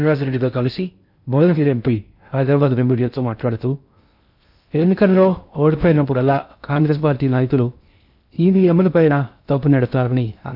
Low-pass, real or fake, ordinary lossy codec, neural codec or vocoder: 5.4 kHz; fake; none; codec, 16 kHz in and 24 kHz out, 0.6 kbps, FocalCodec, streaming, 2048 codes